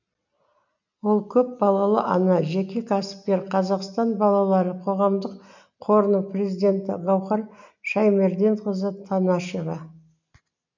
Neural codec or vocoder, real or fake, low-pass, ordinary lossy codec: none; real; 7.2 kHz; none